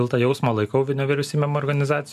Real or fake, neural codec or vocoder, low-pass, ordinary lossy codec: real; none; 14.4 kHz; MP3, 96 kbps